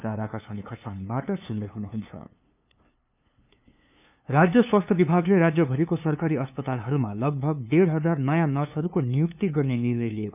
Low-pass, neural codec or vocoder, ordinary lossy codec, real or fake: 3.6 kHz; codec, 16 kHz, 2 kbps, FunCodec, trained on LibriTTS, 25 frames a second; none; fake